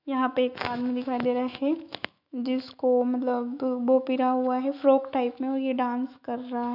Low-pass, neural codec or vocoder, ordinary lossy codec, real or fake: 5.4 kHz; autoencoder, 48 kHz, 128 numbers a frame, DAC-VAE, trained on Japanese speech; none; fake